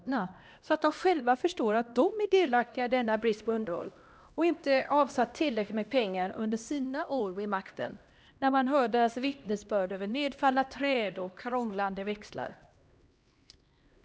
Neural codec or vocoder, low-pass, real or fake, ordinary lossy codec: codec, 16 kHz, 1 kbps, X-Codec, HuBERT features, trained on LibriSpeech; none; fake; none